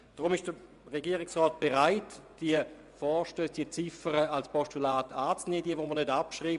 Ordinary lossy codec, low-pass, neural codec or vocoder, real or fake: none; 10.8 kHz; vocoder, 24 kHz, 100 mel bands, Vocos; fake